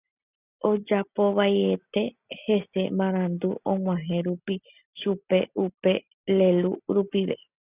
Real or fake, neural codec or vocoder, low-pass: real; none; 3.6 kHz